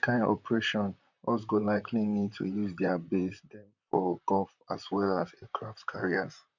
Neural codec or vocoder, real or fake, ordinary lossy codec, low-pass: vocoder, 44.1 kHz, 128 mel bands, Pupu-Vocoder; fake; none; 7.2 kHz